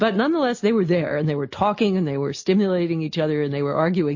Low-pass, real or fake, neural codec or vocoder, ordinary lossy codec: 7.2 kHz; real; none; MP3, 32 kbps